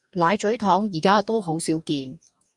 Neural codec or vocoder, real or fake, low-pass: codec, 44.1 kHz, 2.6 kbps, DAC; fake; 10.8 kHz